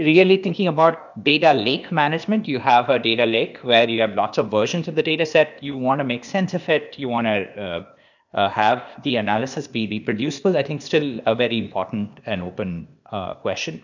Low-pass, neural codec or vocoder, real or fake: 7.2 kHz; codec, 16 kHz, 0.8 kbps, ZipCodec; fake